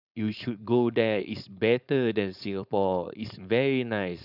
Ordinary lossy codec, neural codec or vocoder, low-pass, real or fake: none; codec, 16 kHz, 4.8 kbps, FACodec; 5.4 kHz; fake